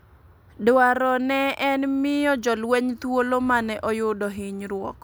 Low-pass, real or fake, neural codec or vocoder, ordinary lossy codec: none; real; none; none